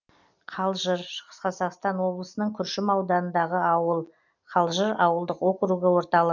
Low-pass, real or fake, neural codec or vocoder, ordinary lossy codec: 7.2 kHz; real; none; none